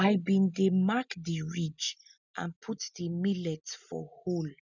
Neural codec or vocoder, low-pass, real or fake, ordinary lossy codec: none; 7.2 kHz; real; none